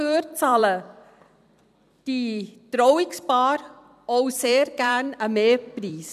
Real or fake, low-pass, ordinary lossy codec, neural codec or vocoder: fake; 14.4 kHz; none; vocoder, 44.1 kHz, 128 mel bands every 256 samples, BigVGAN v2